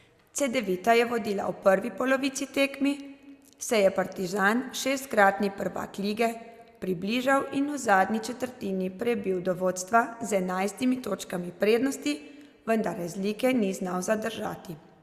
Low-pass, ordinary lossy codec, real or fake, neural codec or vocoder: 14.4 kHz; Opus, 64 kbps; real; none